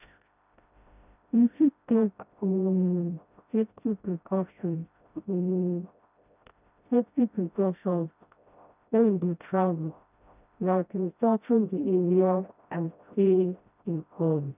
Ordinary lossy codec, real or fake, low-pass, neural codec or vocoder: none; fake; 3.6 kHz; codec, 16 kHz, 0.5 kbps, FreqCodec, smaller model